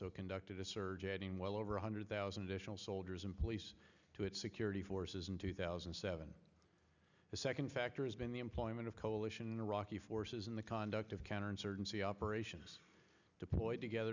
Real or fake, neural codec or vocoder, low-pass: real; none; 7.2 kHz